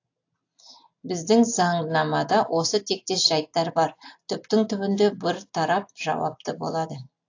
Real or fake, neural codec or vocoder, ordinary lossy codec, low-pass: real; none; AAC, 48 kbps; 7.2 kHz